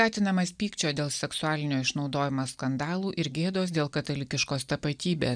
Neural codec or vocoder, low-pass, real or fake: none; 9.9 kHz; real